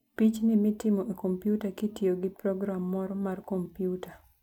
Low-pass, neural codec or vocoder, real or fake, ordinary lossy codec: 19.8 kHz; none; real; none